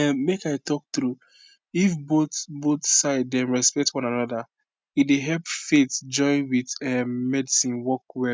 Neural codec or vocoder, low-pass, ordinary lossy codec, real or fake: none; none; none; real